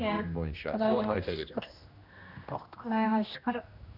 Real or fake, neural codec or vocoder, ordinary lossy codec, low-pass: fake; codec, 16 kHz, 1 kbps, X-Codec, HuBERT features, trained on general audio; none; 5.4 kHz